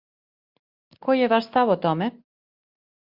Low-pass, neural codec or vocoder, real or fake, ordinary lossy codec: 5.4 kHz; none; real; Opus, 64 kbps